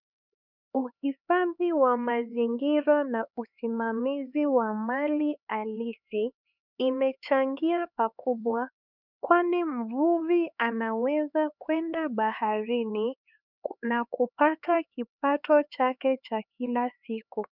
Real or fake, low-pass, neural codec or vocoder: fake; 5.4 kHz; codec, 16 kHz, 4 kbps, X-Codec, HuBERT features, trained on LibriSpeech